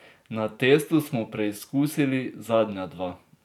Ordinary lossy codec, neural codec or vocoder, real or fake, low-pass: none; vocoder, 44.1 kHz, 128 mel bands every 512 samples, BigVGAN v2; fake; 19.8 kHz